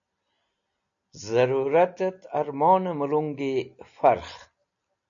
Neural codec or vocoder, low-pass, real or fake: none; 7.2 kHz; real